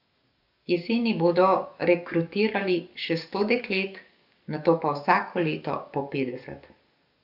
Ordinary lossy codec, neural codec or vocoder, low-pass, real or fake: none; codec, 44.1 kHz, 7.8 kbps, DAC; 5.4 kHz; fake